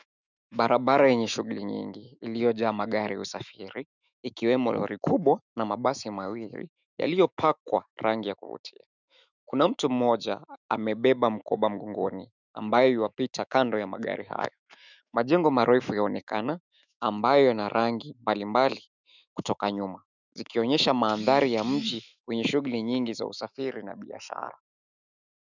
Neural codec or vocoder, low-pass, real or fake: autoencoder, 48 kHz, 128 numbers a frame, DAC-VAE, trained on Japanese speech; 7.2 kHz; fake